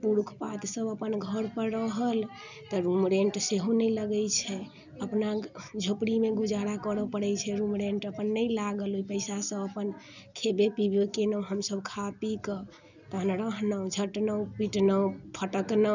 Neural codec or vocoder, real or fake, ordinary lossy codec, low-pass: none; real; none; 7.2 kHz